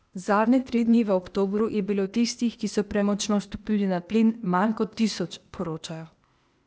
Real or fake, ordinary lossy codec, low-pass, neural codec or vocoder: fake; none; none; codec, 16 kHz, 0.8 kbps, ZipCodec